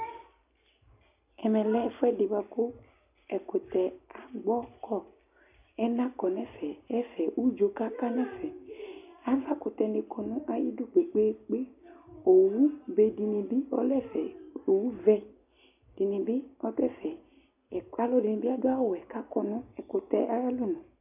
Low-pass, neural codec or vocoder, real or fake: 3.6 kHz; none; real